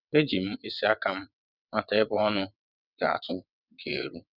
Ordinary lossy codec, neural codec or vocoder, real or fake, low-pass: none; vocoder, 22.05 kHz, 80 mel bands, WaveNeXt; fake; 5.4 kHz